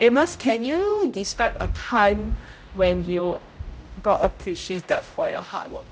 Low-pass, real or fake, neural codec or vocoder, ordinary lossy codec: none; fake; codec, 16 kHz, 0.5 kbps, X-Codec, HuBERT features, trained on general audio; none